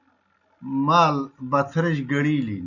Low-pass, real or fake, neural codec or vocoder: 7.2 kHz; real; none